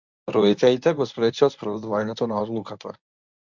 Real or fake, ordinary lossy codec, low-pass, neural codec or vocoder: fake; MP3, 64 kbps; 7.2 kHz; codec, 24 kHz, 0.9 kbps, WavTokenizer, medium speech release version 1